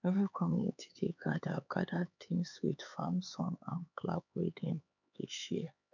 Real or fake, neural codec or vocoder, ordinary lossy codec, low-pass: fake; codec, 16 kHz, 4 kbps, X-Codec, HuBERT features, trained on LibriSpeech; none; 7.2 kHz